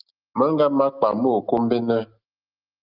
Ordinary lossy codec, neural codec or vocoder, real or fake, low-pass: Opus, 32 kbps; none; real; 5.4 kHz